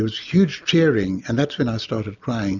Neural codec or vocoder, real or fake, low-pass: none; real; 7.2 kHz